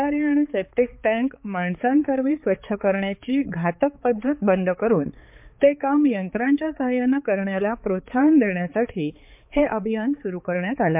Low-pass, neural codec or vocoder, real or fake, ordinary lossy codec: 3.6 kHz; codec, 16 kHz, 4 kbps, X-Codec, HuBERT features, trained on balanced general audio; fake; MP3, 32 kbps